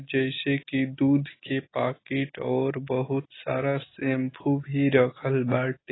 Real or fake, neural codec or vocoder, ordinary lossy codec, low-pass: real; none; AAC, 16 kbps; 7.2 kHz